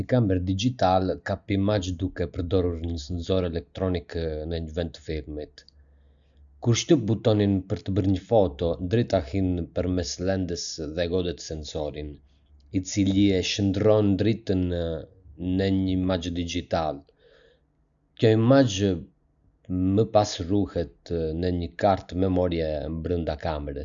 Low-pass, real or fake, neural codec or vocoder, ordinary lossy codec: 7.2 kHz; real; none; none